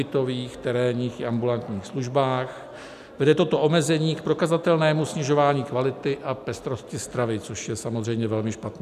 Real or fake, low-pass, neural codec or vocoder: real; 14.4 kHz; none